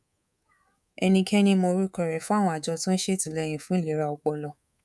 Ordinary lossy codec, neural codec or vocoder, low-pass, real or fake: none; codec, 24 kHz, 3.1 kbps, DualCodec; none; fake